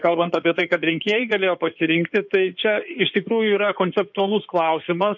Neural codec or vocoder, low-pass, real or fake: codec, 16 kHz in and 24 kHz out, 2.2 kbps, FireRedTTS-2 codec; 7.2 kHz; fake